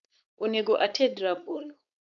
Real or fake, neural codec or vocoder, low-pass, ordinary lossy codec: fake; codec, 16 kHz, 4.8 kbps, FACodec; 7.2 kHz; none